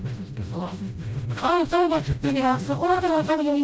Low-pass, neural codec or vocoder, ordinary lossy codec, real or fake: none; codec, 16 kHz, 0.5 kbps, FreqCodec, smaller model; none; fake